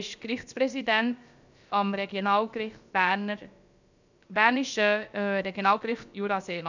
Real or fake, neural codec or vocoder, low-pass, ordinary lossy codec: fake; codec, 16 kHz, about 1 kbps, DyCAST, with the encoder's durations; 7.2 kHz; none